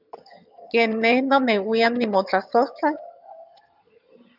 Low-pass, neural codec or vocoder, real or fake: 5.4 kHz; vocoder, 22.05 kHz, 80 mel bands, HiFi-GAN; fake